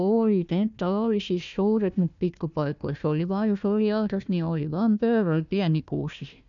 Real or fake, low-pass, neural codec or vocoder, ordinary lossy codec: fake; 7.2 kHz; codec, 16 kHz, 1 kbps, FunCodec, trained on Chinese and English, 50 frames a second; none